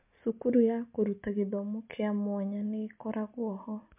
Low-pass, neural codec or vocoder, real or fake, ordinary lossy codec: 3.6 kHz; none; real; MP3, 32 kbps